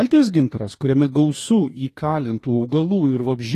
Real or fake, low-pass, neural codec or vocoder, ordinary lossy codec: fake; 14.4 kHz; codec, 44.1 kHz, 2.6 kbps, DAC; AAC, 48 kbps